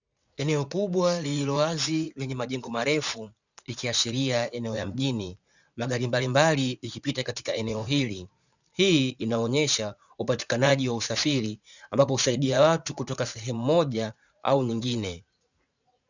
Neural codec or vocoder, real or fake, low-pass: vocoder, 44.1 kHz, 80 mel bands, Vocos; fake; 7.2 kHz